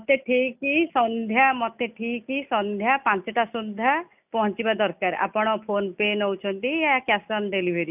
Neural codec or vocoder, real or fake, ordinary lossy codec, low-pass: none; real; none; 3.6 kHz